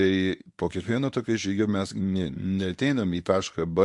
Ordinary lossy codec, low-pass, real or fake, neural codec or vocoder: MP3, 64 kbps; 10.8 kHz; fake; codec, 24 kHz, 0.9 kbps, WavTokenizer, medium speech release version 1